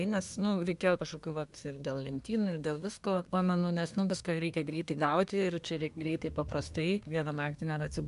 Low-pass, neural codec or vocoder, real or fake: 10.8 kHz; codec, 24 kHz, 1 kbps, SNAC; fake